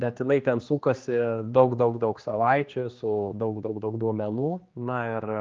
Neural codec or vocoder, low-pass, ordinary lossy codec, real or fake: codec, 16 kHz, 2 kbps, X-Codec, HuBERT features, trained on general audio; 7.2 kHz; Opus, 32 kbps; fake